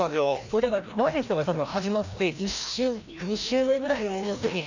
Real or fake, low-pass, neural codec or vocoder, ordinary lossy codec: fake; 7.2 kHz; codec, 16 kHz, 1 kbps, FreqCodec, larger model; none